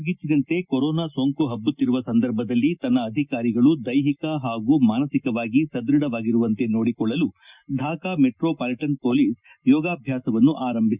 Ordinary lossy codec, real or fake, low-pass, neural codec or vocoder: Opus, 64 kbps; real; 3.6 kHz; none